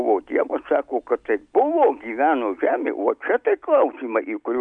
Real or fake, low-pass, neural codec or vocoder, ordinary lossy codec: real; 9.9 kHz; none; MP3, 64 kbps